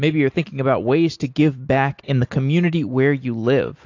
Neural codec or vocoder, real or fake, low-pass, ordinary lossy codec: none; real; 7.2 kHz; AAC, 48 kbps